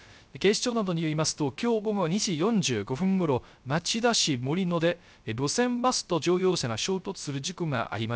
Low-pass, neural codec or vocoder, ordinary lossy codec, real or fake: none; codec, 16 kHz, 0.3 kbps, FocalCodec; none; fake